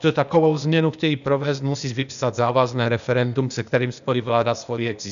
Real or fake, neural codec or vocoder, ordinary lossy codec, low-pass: fake; codec, 16 kHz, 0.8 kbps, ZipCodec; MP3, 96 kbps; 7.2 kHz